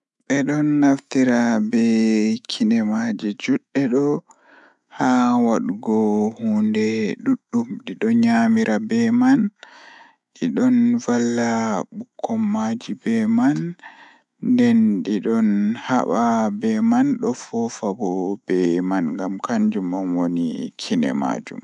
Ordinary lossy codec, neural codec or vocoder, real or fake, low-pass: none; autoencoder, 48 kHz, 128 numbers a frame, DAC-VAE, trained on Japanese speech; fake; 10.8 kHz